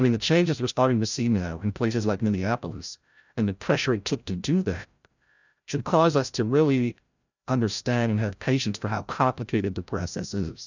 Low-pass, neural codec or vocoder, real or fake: 7.2 kHz; codec, 16 kHz, 0.5 kbps, FreqCodec, larger model; fake